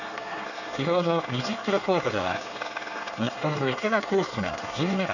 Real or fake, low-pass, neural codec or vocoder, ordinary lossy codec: fake; 7.2 kHz; codec, 24 kHz, 1 kbps, SNAC; none